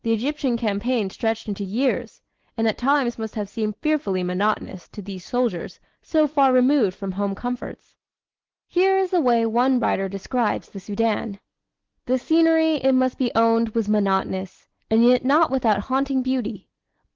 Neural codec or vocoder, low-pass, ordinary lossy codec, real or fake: none; 7.2 kHz; Opus, 16 kbps; real